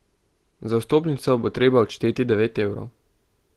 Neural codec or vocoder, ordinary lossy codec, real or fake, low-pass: none; Opus, 16 kbps; real; 14.4 kHz